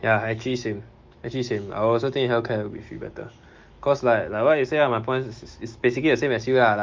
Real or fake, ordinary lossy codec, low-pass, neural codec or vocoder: fake; Opus, 32 kbps; 7.2 kHz; autoencoder, 48 kHz, 128 numbers a frame, DAC-VAE, trained on Japanese speech